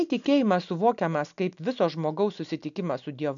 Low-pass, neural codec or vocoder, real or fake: 7.2 kHz; none; real